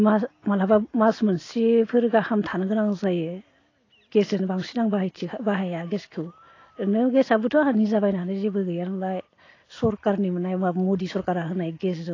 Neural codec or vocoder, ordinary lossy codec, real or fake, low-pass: none; AAC, 32 kbps; real; 7.2 kHz